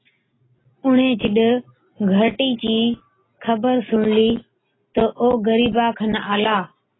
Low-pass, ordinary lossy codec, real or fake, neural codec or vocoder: 7.2 kHz; AAC, 16 kbps; real; none